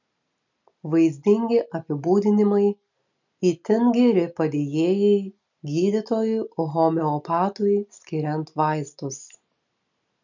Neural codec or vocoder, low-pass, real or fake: none; 7.2 kHz; real